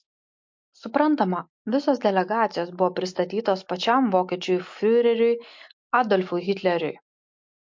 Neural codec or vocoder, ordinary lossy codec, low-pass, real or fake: none; MP3, 48 kbps; 7.2 kHz; real